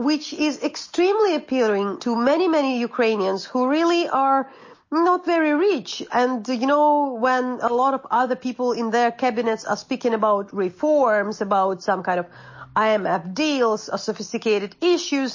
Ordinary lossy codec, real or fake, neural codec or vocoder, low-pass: MP3, 32 kbps; real; none; 7.2 kHz